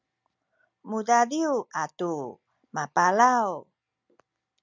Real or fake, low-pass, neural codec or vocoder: real; 7.2 kHz; none